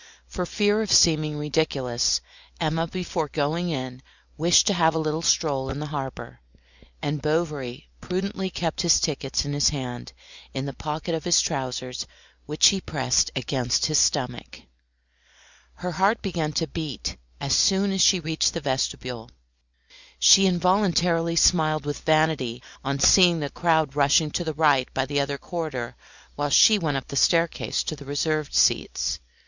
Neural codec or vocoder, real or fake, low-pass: none; real; 7.2 kHz